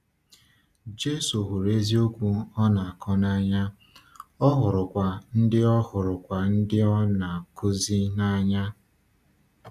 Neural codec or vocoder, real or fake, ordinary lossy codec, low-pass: none; real; none; 14.4 kHz